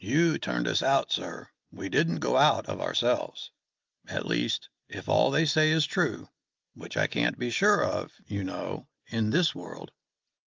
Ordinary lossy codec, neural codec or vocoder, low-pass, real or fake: Opus, 32 kbps; none; 7.2 kHz; real